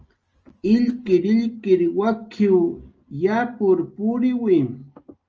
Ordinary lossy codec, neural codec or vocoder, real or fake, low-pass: Opus, 24 kbps; none; real; 7.2 kHz